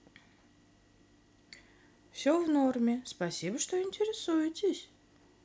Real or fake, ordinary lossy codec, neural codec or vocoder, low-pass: real; none; none; none